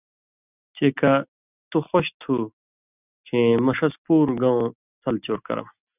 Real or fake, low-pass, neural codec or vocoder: real; 3.6 kHz; none